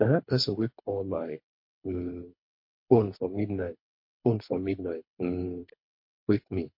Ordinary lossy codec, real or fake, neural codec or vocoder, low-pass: MP3, 32 kbps; fake; codec, 24 kHz, 3 kbps, HILCodec; 5.4 kHz